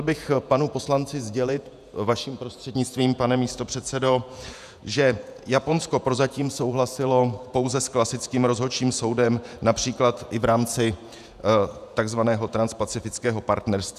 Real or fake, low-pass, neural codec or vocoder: fake; 14.4 kHz; vocoder, 48 kHz, 128 mel bands, Vocos